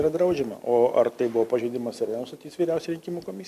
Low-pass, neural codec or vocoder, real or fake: 14.4 kHz; none; real